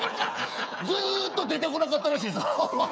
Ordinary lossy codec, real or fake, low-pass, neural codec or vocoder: none; fake; none; codec, 16 kHz, 8 kbps, FreqCodec, smaller model